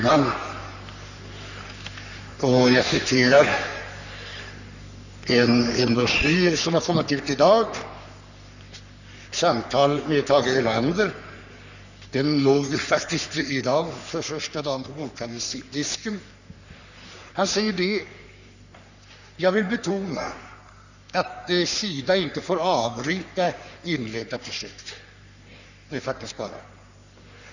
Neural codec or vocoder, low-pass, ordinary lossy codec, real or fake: codec, 44.1 kHz, 3.4 kbps, Pupu-Codec; 7.2 kHz; none; fake